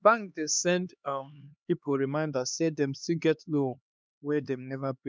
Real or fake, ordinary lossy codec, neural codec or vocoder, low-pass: fake; none; codec, 16 kHz, 2 kbps, X-Codec, HuBERT features, trained on LibriSpeech; none